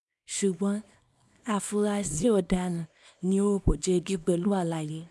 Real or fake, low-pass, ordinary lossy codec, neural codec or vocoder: fake; none; none; codec, 24 kHz, 0.9 kbps, WavTokenizer, small release